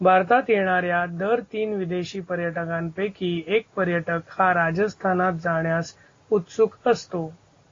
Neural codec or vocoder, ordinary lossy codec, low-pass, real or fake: none; AAC, 32 kbps; 7.2 kHz; real